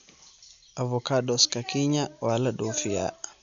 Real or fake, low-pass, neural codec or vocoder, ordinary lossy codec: real; 7.2 kHz; none; none